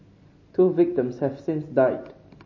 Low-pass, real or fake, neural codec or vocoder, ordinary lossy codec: 7.2 kHz; real; none; MP3, 32 kbps